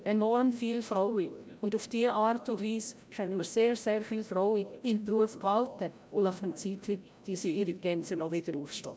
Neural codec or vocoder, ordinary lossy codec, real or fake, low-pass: codec, 16 kHz, 0.5 kbps, FreqCodec, larger model; none; fake; none